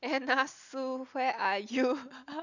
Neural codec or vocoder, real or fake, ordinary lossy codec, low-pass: none; real; none; 7.2 kHz